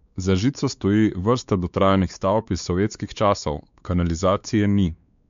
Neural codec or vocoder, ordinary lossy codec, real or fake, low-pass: codec, 16 kHz, 4 kbps, X-Codec, WavLM features, trained on Multilingual LibriSpeech; MP3, 64 kbps; fake; 7.2 kHz